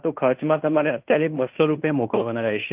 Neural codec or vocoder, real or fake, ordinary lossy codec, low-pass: codec, 16 kHz in and 24 kHz out, 0.9 kbps, LongCat-Audio-Codec, fine tuned four codebook decoder; fake; Opus, 24 kbps; 3.6 kHz